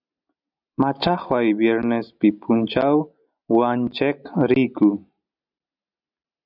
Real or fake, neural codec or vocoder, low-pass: real; none; 5.4 kHz